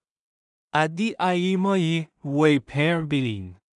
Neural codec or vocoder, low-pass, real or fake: codec, 16 kHz in and 24 kHz out, 0.4 kbps, LongCat-Audio-Codec, two codebook decoder; 10.8 kHz; fake